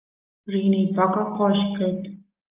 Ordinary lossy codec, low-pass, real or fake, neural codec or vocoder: Opus, 32 kbps; 3.6 kHz; real; none